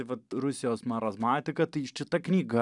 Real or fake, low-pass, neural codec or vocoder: real; 10.8 kHz; none